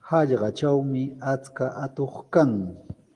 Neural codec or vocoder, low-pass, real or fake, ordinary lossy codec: none; 10.8 kHz; real; Opus, 24 kbps